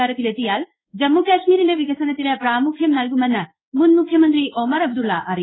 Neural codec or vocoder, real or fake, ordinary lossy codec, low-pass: codec, 16 kHz in and 24 kHz out, 1 kbps, XY-Tokenizer; fake; AAC, 16 kbps; 7.2 kHz